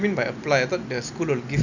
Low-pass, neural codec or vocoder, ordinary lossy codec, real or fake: 7.2 kHz; none; none; real